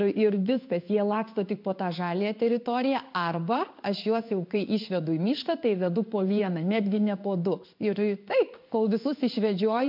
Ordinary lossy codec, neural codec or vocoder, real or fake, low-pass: MP3, 48 kbps; codec, 16 kHz in and 24 kHz out, 1 kbps, XY-Tokenizer; fake; 5.4 kHz